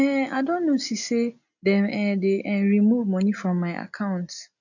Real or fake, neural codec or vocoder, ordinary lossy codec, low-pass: real; none; none; 7.2 kHz